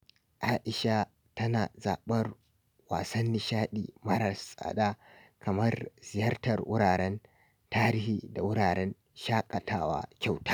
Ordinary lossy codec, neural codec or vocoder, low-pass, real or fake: none; none; none; real